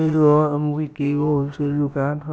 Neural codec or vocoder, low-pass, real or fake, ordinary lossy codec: codec, 16 kHz, about 1 kbps, DyCAST, with the encoder's durations; none; fake; none